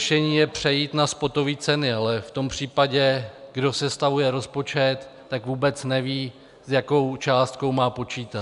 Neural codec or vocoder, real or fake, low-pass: none; real; 10.8 kHz